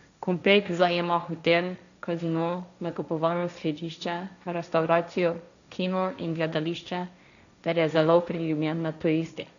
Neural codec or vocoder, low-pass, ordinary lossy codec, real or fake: codec, 16 kHz, 1.1 kbps, Voila-Tokenizer; 7.2 kHz; none; fake